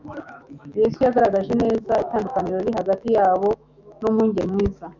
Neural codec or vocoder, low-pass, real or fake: autoencoder, 48 kHz, 128 numbers a frame, DAC-VAE, trained on Japanese speech; 7.2 kHz; fake